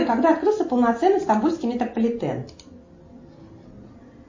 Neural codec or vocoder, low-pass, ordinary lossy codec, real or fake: none; 7.2 kHz; MP3, 32 kbps; real